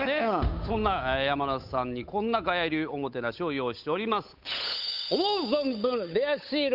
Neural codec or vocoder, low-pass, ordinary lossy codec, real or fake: codec, 16 kHz, 8 kbps, FunCodec, trained on Chinese and English, 25 frames a second; 5.4 kHz; none; fake